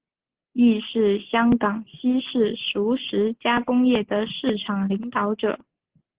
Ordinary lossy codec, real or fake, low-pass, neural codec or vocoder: Opus, 16 kbps; real; 3.6 kHz; none